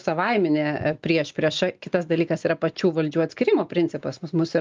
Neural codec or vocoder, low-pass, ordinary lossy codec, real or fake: none; 7.2 kHz; Opus, 32 kbps; real